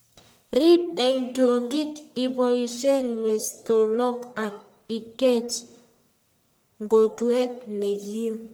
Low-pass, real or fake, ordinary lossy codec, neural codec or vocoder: none; fake; none; codec, 44.1 kHz, 1.7 kbps, Pupu-Codec